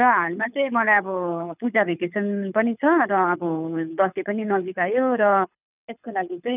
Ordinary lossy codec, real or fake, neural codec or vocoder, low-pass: none; fake; codec, 24 kHz, 6 kbps, HILCodec; 3.6 kHz